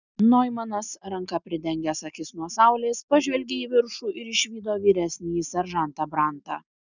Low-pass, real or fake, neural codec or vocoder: 7.2 kHz; real; none